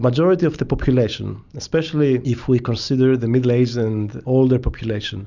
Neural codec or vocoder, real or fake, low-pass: none; real; 7.2 kHz